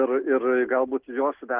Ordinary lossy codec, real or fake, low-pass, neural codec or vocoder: Opus, 16 kbps; real; 3.6 kHz; none